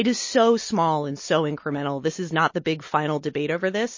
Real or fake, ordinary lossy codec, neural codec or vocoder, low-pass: real; MP3, 32 kbps; none; 7.2 kHz